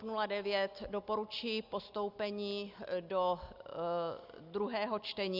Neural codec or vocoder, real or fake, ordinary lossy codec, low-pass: none; real; Opus, 64 kbps; 5.4 kHz